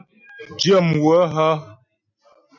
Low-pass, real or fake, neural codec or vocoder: 7.2 kHz; real; none